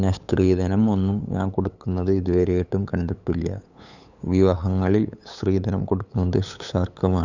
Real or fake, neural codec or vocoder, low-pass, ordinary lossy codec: fake; codec, 16 kHz, 8 kbps, FunCodec, trained on LibriTTS, 25 frames a second; 7.2 kHz; none